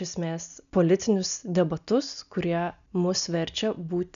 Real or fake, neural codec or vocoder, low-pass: real; none; 7.2 kHz